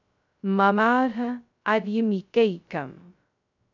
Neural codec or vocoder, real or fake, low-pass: codec, 16 kHz, 0.2 kbps, FocalCodec; fake; 7.2 kHz